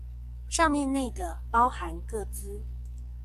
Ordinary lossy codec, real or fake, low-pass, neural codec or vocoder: AAC, 96 kbps; fake; 14.4 kHz; codec, 44.1 kHz, 2.6 kbps, SNAC